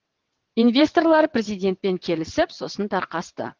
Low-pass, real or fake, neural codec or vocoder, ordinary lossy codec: 7.2 kHz; fake; vocoder, 22.05 kHz, 80 mel bands, WaveNeXt; Opus, 16 kbps